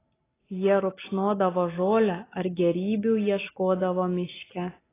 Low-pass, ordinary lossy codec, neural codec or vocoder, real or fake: 3.6 kHz; AAC, 16 kbps; none; real